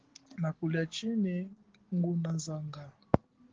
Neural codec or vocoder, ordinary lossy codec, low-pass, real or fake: none; Opus, 16 kbps; 7.2 kHz; real